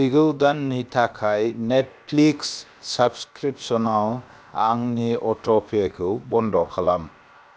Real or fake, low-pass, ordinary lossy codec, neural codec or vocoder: fake; none; none; codec, 16 kHz, about 1 kbps, DyCAST, with the encoder's durations